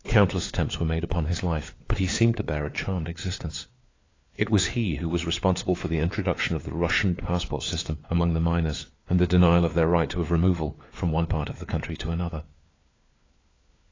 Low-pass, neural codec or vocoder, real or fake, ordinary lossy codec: 7.2 kHz; codec, 16 kHz, 4 kbps, FunCodec, trained on LibriTTS, 50 frames a second; fake; AAC, 32 kbps